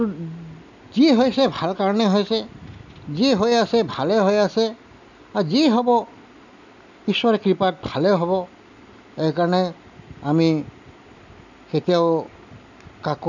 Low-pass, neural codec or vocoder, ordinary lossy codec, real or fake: 7.2 kHz; none; none; real